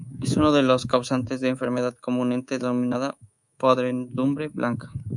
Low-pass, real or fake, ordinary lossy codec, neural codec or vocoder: 10.8 kHz; fake; MP3, 96 kbps; codec, 24 kHz, 3.1 kbps, DualCodec